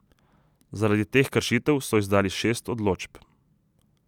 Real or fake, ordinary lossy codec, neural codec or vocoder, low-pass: real; none; none; 19.8 kHz